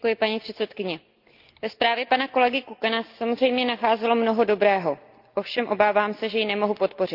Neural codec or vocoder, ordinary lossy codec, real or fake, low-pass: none; Opus, 24 kbps; real; 5.4 kHz